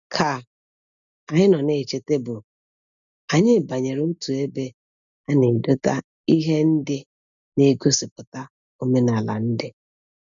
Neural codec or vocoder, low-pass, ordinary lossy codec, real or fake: none; 7.2 kHz; none; real